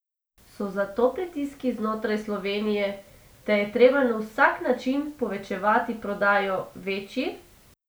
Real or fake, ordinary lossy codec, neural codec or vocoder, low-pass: real; none; none; none